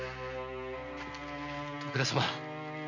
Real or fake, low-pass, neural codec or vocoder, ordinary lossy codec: real; 7.2 kHz; none; MP3, 64 kbps